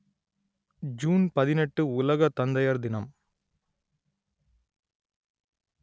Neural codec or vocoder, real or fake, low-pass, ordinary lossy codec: none; real; none; none